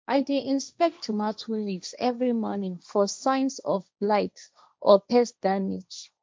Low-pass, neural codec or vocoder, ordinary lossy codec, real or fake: none; codec, 16 kHz, 1.1 kbps, Voila-Tokenizer; none; fake